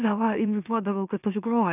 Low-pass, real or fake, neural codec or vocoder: 3.6 kHz; fake; autoencoder, 44.1 kHz, a latent of 192 numbers a frame, MeloTTS